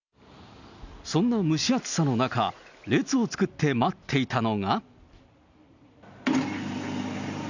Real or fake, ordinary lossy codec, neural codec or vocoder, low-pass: real; none; none; 7.2 kHz